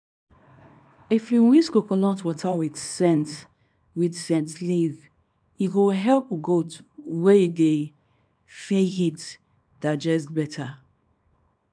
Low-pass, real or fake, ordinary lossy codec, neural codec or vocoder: 9.9 kHz; fake; none; codec, 24 kHz, 0.9 kbps, WavTokenizer, small release